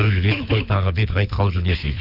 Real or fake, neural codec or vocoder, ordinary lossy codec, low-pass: fake; codec, 24 kHz, 6 kbps, HILCodec; none; 5.4 kHz